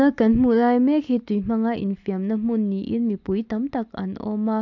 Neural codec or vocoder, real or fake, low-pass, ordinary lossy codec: none; real; 7.2 kHz; AAC, 48 kbps